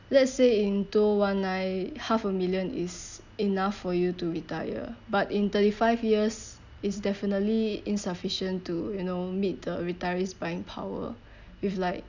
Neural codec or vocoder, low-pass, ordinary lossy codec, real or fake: none; 7.2 kHz; none; real